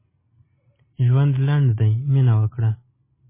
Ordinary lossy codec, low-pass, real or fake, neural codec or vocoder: MP3, 16 kbps; 3.6 kHz; real; none